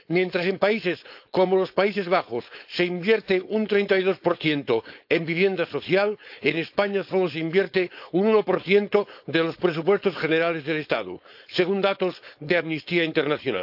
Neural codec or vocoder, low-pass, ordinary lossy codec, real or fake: codec, 16 kHz, 4.8 kbps, FACodec; 5.4 kHz; AAC, 48 kbps; fake